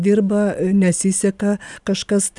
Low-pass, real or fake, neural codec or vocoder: 10.8 kHz; real; none